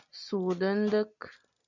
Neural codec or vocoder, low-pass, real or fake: none; 7.2 kHz; real